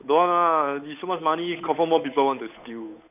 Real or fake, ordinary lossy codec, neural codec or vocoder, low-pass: fake; none; codec, 16 kHz, 8 kbps, FunCodec, trained on Chinese and English, 25 frames a second; 3.6 kHz